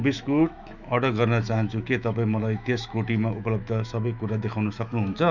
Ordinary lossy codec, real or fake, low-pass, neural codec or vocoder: none; fake; 7.2 kHz; autoencoder, 48 kHz, 128 numbers a frame, DAC-VAE, trained on Japanese speech